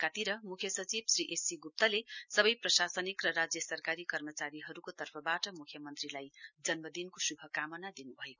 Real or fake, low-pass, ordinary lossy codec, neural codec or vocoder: real; 7.2 kHz; none; none